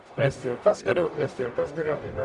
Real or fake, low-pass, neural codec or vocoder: fake; 10.8 kHz; codec, 44.1 kHz, 0.9 kbps, DAC